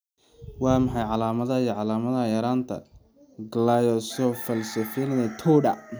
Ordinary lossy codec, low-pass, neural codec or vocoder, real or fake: none; none; none; real